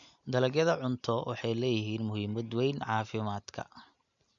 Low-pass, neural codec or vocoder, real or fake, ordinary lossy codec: 7.2 kHz; none; real; none